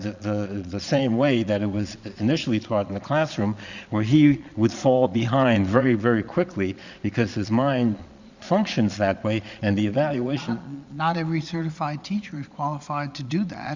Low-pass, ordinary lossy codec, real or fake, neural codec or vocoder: 7.2 kHz; Opus, 64 kbps; fake; vocoder, 22.05 kHz, 80 mel bands, Vocos